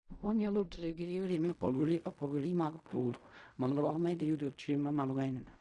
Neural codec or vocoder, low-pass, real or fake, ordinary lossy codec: codec, 16 kHz in and 24 kHz out, 0.4 kbps, LongCat-Audio-Codec, fine tuned four codebook decoder; 10.8 kHz; fake; Opus, 64 kbps